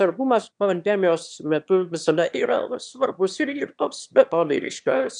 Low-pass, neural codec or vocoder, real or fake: 9.9 kHz; autoencoder, 22.05 kHz, a latent of 192 numbers a frame, VITS, trained on one speaker; fake